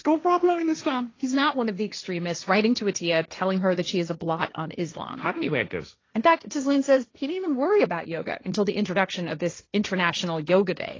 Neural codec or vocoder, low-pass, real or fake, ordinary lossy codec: codec, 16 kHz, 1.1 kbps, Voila-Tokenizer; 7.2 kHz; fake; AAC, 32 kbps